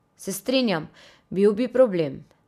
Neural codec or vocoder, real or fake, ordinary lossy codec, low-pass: none; real; none; 14.4 kHz